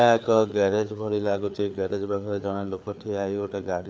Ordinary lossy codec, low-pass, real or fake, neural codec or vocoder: none; none; fake; codec, 16 kHz, 4 kbps, FreqCodec, larger model